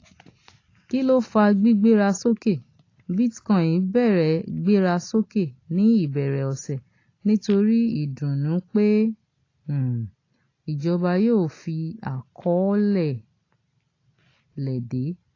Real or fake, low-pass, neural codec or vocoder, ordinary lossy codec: real; 7.2 kHz; none; AAC, 32 kbps